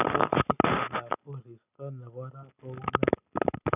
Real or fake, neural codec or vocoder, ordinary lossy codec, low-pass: real; none; none; 3.6 kHz